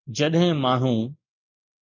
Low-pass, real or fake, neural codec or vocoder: 7.2 kHz; real; none